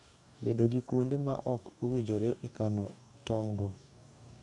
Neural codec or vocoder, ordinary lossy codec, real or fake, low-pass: codec, 44.1 kHz, 2.6 kbps, DAC; none; fake; 10.8 kHz